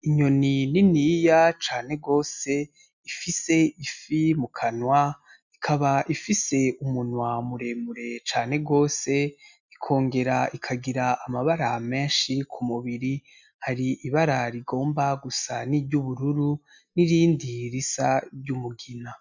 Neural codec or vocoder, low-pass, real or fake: none; 7.2 kHz; real